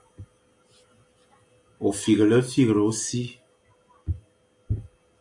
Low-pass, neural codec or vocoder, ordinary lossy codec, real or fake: 10.8 kHz; none; AAC, 64 kbps; real